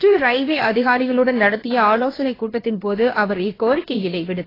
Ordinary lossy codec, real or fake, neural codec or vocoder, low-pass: AAC, 24 kbps; fake; codec, 16 kHz, about 1 kbps, DyCAST, with the encoder's durations; 5.4 kHz